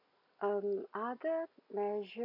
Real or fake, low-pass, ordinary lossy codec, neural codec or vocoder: real; 5.4 kHz; AAC, 32 kbps; none